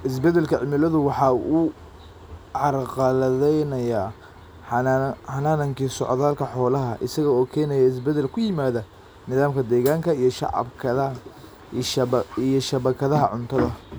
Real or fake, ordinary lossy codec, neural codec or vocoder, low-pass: real; none; none; none